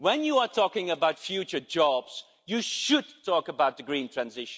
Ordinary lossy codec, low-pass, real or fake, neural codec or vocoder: none; none; real; none